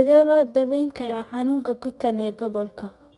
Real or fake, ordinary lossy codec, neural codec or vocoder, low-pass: fake; none; codec, 24 kHz, 0.9 kbps, WavTokenizer, medium music audio release; 10.8 kHz